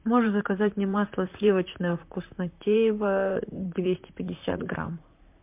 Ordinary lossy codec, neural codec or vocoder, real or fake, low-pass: MP3, 32 kbps; vocoder, 44.1 kHz, 128 mel bands, Pupu-Vocoder; fake; 3.6 kHz